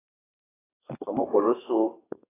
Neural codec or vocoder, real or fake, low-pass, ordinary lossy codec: codec, 32 kHz, 1.9 kbps, SNAC; fake; 3.6 kHz; AAC, 16 kbps